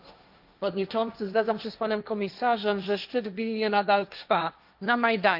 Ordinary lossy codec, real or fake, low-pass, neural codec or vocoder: none; fake; 5.4 kHz; codec, 16 kHz, 1.1 kbps, Voila-Tokenizer